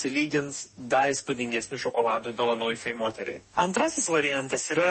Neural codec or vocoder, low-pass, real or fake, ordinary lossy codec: codec, 44.1 kHz, 2.6 kbps, DAC; 10.8 kHz; fake; MP3, 32 kbps